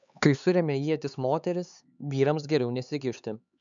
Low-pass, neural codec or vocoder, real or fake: 7.2 kHz; codec, 16 kHz, 4 kbps, X-Codec, HuBERT features, trained on LibriSpeech; fake